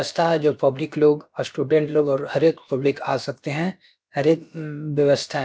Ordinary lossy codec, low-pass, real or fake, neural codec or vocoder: none; none; fake; codec, 16 kHz, about 1 kbps, DyCAST, with the encoder's durations